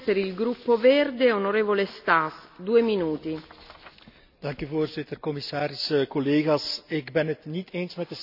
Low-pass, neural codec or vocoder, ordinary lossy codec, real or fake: 5.4 kHz; none; none; real